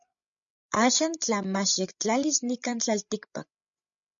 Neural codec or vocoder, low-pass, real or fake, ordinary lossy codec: codec, 16 kHz, 16 kbps, FreqCodec, larger model; 7.2 kHz; fake; MP3, 64 kbps